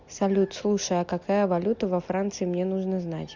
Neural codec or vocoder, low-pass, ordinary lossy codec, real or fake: none; 7.2 kHz; MP3, 64 kbps; real